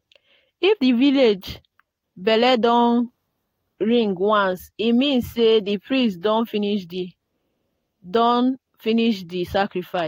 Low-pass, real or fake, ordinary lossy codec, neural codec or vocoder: 19.8 kHz; real; AAC, 48 kbps; none